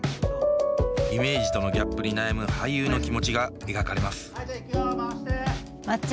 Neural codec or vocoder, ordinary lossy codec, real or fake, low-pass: none; none; real; none